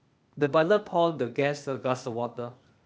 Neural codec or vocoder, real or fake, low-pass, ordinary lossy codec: codec, 16 kHz, 0.8 kbps, ZipCodec; fake; none; none